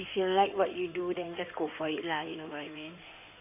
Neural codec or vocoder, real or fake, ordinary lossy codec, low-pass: codec, 16 kHz in and 24 kHz out, 2.2 kbps, FireRedTTS-2 codec; fake; none; 3.6 kHz